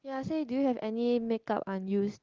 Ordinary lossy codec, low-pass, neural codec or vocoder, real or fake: Opus, 16 kbps; 7.2 kHz; none; real